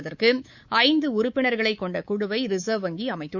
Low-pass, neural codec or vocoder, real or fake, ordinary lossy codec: 7.2 kHz; codec, 24 kHz, 3.1 kbps, DualCodec; fake; Opus, 64 kbps